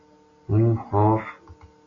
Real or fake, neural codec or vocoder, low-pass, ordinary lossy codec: real; none; 7.2 kHz; MP3, 48 kbps